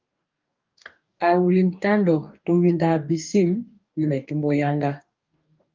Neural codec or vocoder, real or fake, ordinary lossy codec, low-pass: codec, 44.1 kHz, 2.6 kbps, DAC; fake; Opus, 24 kbps; 7.2 kHz